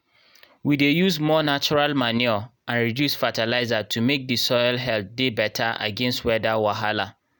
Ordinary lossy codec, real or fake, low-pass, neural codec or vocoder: none; real; none; none